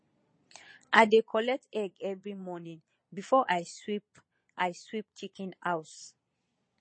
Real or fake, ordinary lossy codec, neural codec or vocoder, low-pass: fake; MP3, 32 kbps; vocoder, 22.05 kHz, 80 mel bands, Vocos; 9.9 kHz